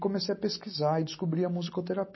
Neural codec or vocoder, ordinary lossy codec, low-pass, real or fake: none; MP3, 24 kbps; 7.2 kHz; real